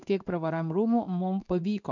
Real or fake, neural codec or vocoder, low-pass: fake; codec, 16 kHz in and 24 kHz out, 1 kbps, XY-Tokenizer; 7.2 kHz